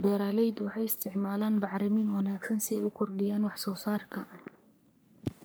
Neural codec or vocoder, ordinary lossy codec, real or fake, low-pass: codec, 44.1 kHz, 3.4 kbps, Pupu-Codec; none; fake; none